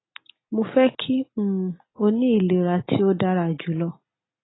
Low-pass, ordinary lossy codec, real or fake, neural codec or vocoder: 7.2 kHz; AAC, 16 kbps; real; none